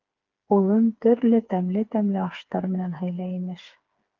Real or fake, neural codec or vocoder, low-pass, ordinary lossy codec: fake; codec, 16 kHz, 4 kbps, FreqCodec, smaller model; 7.2 kHz; Opus, 32 kbps